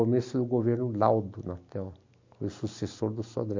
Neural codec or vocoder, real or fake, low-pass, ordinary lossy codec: none; real; 7.2 kHz; MP3, 48 kbps